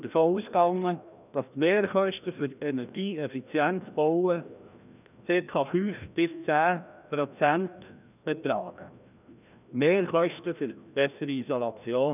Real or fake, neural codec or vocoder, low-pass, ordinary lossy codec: fake; codec, 16 kHz, 1 kbps, FreqCodec, larger model; 3.6 kHz; none